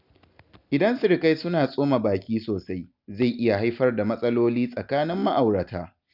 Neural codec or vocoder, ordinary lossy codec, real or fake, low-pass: none; none; real; 5.4 kHz